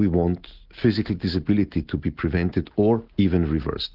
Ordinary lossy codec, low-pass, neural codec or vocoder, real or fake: Opus, 16 kbps; 5.4 kHz; none; real